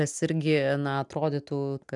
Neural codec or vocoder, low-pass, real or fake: none; 10.8 kHz; real